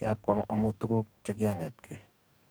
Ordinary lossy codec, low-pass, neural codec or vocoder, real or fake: none; none; codec, 44.1 kHz, 2.6 kbps, DAC; fake